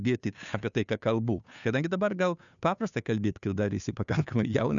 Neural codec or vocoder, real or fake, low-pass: codec, 16 kHz, 2 kbps, FunCodec, trained on Chinese and English, 25 frames a second; fake; 7.2 kHz